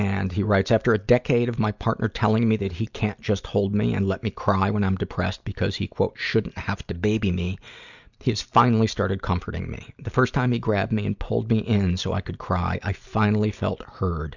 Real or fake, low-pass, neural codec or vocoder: real; 7.2 kHz; none